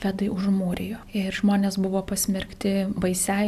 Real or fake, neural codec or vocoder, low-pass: real; none; 14.4 kHz